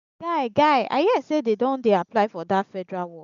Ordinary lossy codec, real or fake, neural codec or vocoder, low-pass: none; real; none; 7.2 kHz